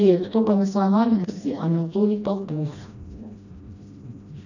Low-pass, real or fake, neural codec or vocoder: 7.2 kHz; fake; codec, 16 kHz, 1 kbps, FreqCodec, smaller model